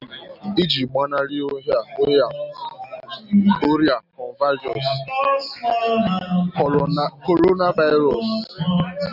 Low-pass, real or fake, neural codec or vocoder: 5.4 kHz; real; none